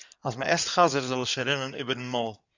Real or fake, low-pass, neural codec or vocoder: fake; 7.2 kHz; codec, 16 kHz in and 24 kHz out, 2.2 kbps, FireRedTTS-2 codec